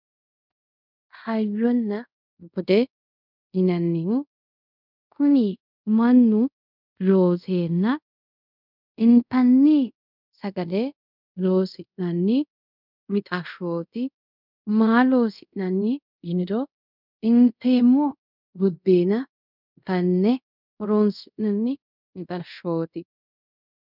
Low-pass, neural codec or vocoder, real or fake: 5.4 kHz; codec, 24 kHz, 0.5 kbps, DualCodec; fake